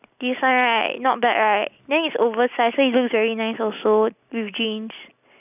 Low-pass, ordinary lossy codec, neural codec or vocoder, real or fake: 3.6 kHz; none; none; real